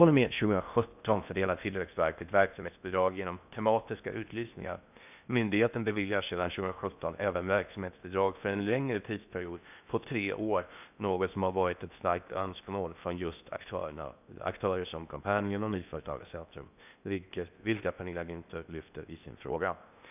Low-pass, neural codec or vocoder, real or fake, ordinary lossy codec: 3.6 kHz; codec, 16 kHz in and 24 kHz out, 0.6 kbps, FocalCodec, streaming, 4096 codes; fake; none